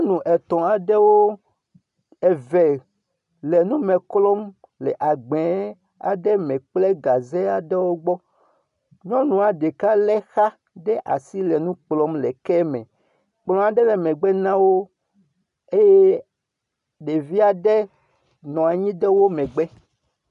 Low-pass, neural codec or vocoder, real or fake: 10.8 kHz; none; real